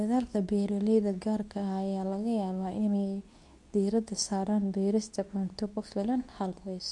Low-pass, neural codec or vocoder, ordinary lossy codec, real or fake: 10.8 kHz; codec, 24 kHz, 0.9 kbps, WavTokenizer, medium speech release version 2; none; fake